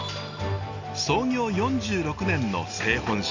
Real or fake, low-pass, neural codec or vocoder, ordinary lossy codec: real; 7.2 kHz; none; none